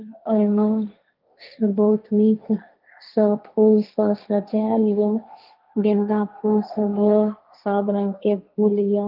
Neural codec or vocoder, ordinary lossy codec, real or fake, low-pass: codec, 16 kHz, 1.1 kbps, Voila-Tokenizer; Opus, 24 kbps; fake; 5.4 kHz